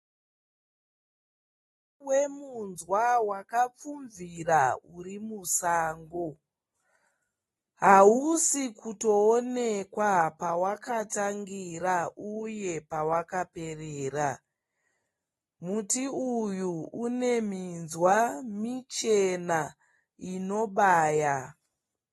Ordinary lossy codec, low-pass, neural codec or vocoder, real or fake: AAC, 32 kbps; 14.4 kHz; none; real